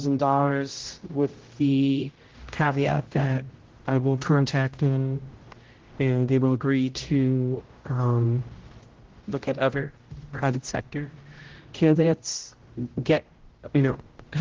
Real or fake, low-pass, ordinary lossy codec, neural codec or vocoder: fake; 7.2 kHz; Opus, 16 kbps; codec, 16 kHz, 0.5 kbps, X-Codec, HuBERT features, trained on general audio